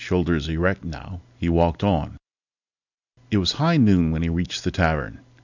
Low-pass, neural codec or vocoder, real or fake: 7.2 kHz; none; real